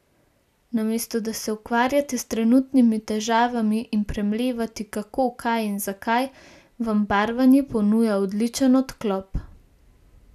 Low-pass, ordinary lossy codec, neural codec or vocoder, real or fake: 14.4 kHz; none; none; real